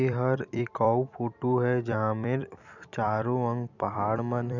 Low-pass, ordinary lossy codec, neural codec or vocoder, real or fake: 7.2 kHz; none; vocoder, 44.1 kHz, 128 mel bands every 256 samples, BigVGAN v2; fake